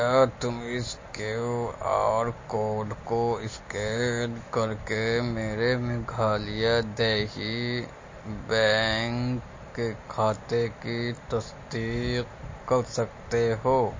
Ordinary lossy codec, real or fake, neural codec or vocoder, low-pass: MP3, 32 kbps; real; none; 7.2 kHz